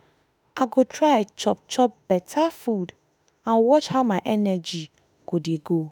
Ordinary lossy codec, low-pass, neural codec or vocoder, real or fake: none; none; autoencoder, 48 kHz, 32 numbers a frame, DAC-VAE, trained on Japanese speech; fake